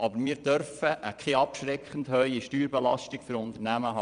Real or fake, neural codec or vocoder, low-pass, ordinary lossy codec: fake; vocoder, 22.05 kHz, 80 mel bands, Vocos; 9.9 kHz; none